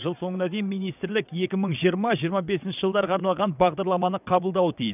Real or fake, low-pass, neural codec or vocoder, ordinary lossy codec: fake; 3.6 kHz; vocoder, 22.05 kHz, 80 mel bands, WaveNeXt; none